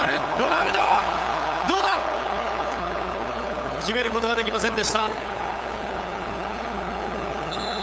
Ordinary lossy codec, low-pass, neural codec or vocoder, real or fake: none; none; codec, 16 kHz, 8 kbps, FunCodec, trained on LibriTTS, 25 frames a second; fake